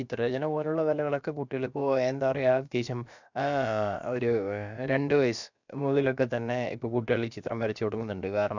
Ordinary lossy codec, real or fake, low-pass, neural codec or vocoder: none; fake; 7.2 kHz; codec, 16 kHz, about 1 kbps, DyCAST, with the encoder's durations